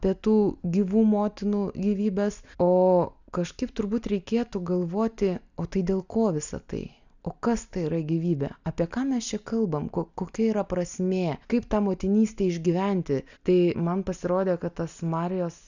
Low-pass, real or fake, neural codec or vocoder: 7.2 kHz; real; none